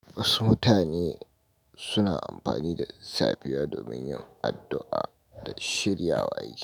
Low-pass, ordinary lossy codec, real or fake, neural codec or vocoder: none; none; fake; autoencoder, 48 kHz, 128 numbers a frame, DAC-VAE, trained on Japanese speech